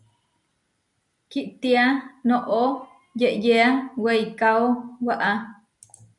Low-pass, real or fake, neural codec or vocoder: 10.8 kHz; real; none